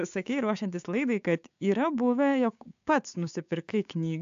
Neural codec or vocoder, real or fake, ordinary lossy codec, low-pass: codec, 16 kHz, 6 kbps, DAC; fake; AAC, 64 kbps; 7.2 kHz